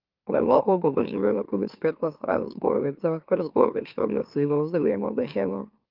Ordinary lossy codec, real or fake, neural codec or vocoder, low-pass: Opus, 24 kbps; fake; autoencoder, 44.1 kHz, a latent of 192 numbers a frame, MeloTTS; 5.4 kHz